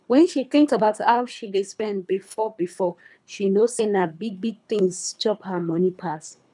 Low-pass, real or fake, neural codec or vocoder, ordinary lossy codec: 10.8 kHz; fake; codec, 24 kHz, 3 kbps, HILCodec; none